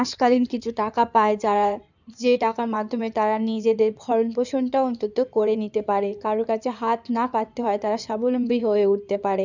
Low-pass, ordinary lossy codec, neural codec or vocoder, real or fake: 7.2 kHz; none; codec, 16 kHz in and 24 kHz out, 2.2 kbps, FireRedTTS-2 codec; fake